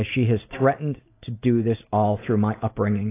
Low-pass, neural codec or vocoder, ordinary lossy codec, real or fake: 3.6 kHz; none; AAC, 24 kbps; real